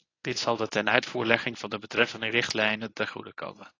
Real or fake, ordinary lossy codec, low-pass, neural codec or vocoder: fake; AAC, 32 kbps; 7.2 kHz; codec, 24 kHz, 0.9 kbps, WavTokenizer, medium speech release version 1